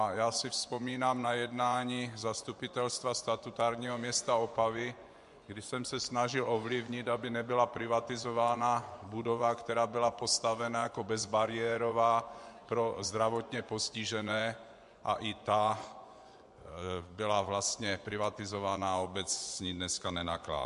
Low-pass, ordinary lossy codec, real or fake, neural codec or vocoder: 10.8 kHz; MP3, 64 kbps; fake; vocoder, 24 kHz, 100 mel bands, Vocos